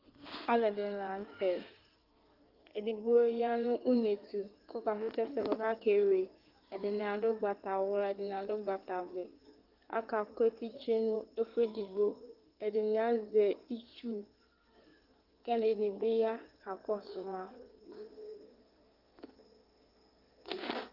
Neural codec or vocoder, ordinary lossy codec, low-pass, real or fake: codec, 16 kHz in and 24 kHz out, 2.2 kbps, FireRedTTS-2 codec; Opus, 32 kbps; 5.4 kHz; fake